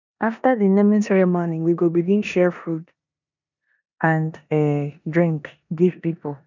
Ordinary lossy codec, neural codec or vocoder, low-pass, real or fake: none; codec, 16 kHz in and 24 kHz out, 0.9 kbps, LongCat-Audio-Codec, four codebook decoder; 7.2 kHz; fake